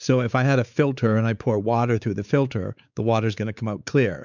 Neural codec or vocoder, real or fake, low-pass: codec, 16 kHz, 4 kbps, X-Codec, WavLM features, trained on Multilingual LibriSpeech; fake; 7.2 kHz